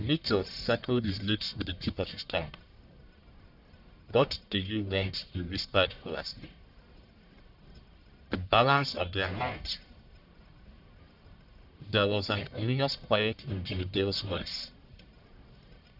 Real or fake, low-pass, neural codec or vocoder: fake; 5.4 kHz; codec, 44.1 kHz, 1.7 kbps, Pupu-Codec